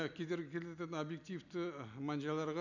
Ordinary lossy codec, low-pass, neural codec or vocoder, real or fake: none; 7.2 kHz; none; real